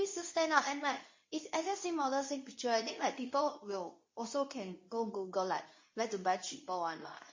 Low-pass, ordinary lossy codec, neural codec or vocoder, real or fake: 7.2 kHz; MP3, 32 kbps; codec, 24 kHz, 0.9 kbps, WavTokenizer, medium speech release version 2; fake